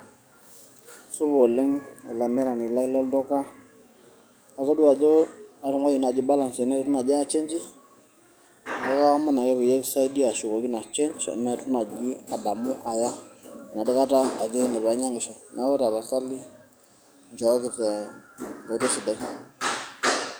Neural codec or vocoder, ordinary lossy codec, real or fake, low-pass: codec, 44.1 kHz, 7.8 kbps, DAC; none; fake; none